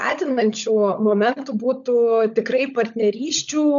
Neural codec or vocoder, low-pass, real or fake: codec, 16 kHz, 16 kbps, FunCodec, trained on LibriTTS, 50 frames a second; 7.2 kHz; fake